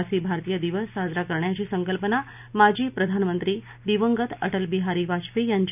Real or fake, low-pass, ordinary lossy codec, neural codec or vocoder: real; 3.6 kHz; none; none